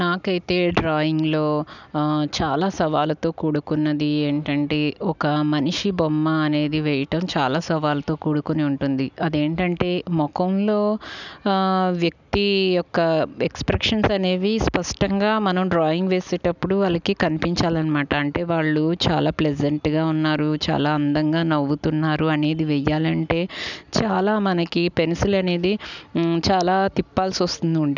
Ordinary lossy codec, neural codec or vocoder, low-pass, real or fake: none; none; 7.2 kHz; real